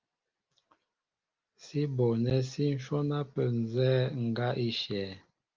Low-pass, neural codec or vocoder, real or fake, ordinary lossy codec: 7.2 kHz; none; real; Opus, 24 kbps